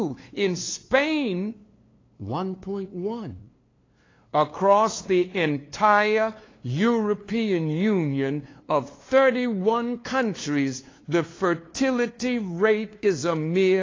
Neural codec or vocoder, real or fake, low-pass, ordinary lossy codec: codec, 16 kHz, 2 kbps, FunCodec, trained on LibriTTS, 25 frames a second; fake; 7.2 kHz; AAC, 32 kbps